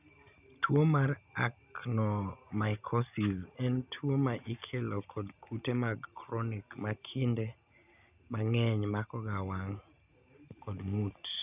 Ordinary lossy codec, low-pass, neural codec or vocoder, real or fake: none; 3.6 kHz; none; real